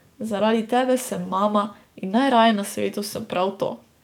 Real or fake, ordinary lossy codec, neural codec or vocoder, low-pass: fake; none; codec, 44.1 kHz, 7.8 kbps, DAC; 19.8 kHz